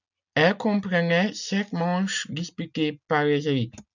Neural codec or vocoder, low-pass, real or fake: none; 7.2 kHz; real